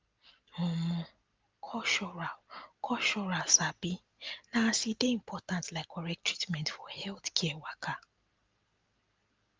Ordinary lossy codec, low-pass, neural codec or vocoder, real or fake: Opus, 24 kbps; 7.2 kHz; none; real